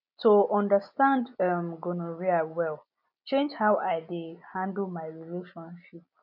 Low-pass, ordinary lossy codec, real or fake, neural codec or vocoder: 5.4 kHz; none; real; none